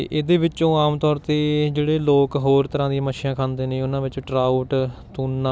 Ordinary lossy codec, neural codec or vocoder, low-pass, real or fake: none; none; none; real